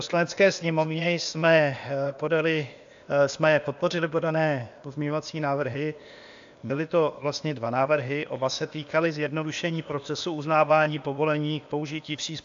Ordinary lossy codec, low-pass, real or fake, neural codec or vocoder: AAC, 64 kbps; 7.2 kHz; fake; codec, 16 kHz, 0.8 kbps, ZipCodec